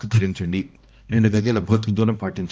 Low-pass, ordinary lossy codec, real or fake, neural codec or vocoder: none; none; fake; codec, 16 kHz, 1 kbps, X-Codec, HuBERT features, trained on balanced general audio